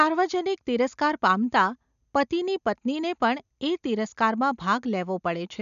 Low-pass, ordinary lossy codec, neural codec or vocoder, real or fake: 7.2 kHz; none; none; real